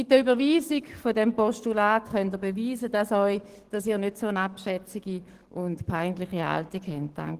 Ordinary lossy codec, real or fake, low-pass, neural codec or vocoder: Opus, 16 kbps; fake; 14.4 kHz; codec, 44.1 kHz, 7.8 kbps, DAC